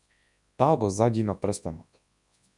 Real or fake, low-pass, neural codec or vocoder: fake; 10.8 kHz; codec, 24 kHz, 0.9 kbps, WavTokenizer, large speech release